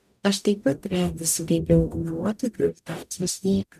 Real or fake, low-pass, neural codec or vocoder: fake; 14.4 kHz; codec, 44.1 kHz, 0.9 kbps, DAC